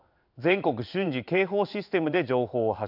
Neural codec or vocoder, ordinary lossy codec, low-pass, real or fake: codec, 16 kHz in and 24 kHz out, 1 kbps, XY-Tokenizer; none; 5.4 kHz; fake